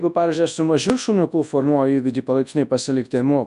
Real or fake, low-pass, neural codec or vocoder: fake; 10.8 kHz; codec, 24 kHz, 0.9 kbps, WavTokenizer, large speech release